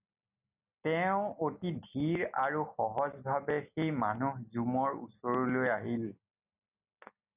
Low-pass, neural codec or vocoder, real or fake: 3.6 kHz; none; real